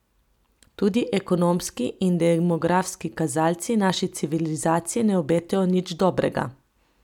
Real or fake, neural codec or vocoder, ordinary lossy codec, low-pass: real; none; none; 19.8 kHz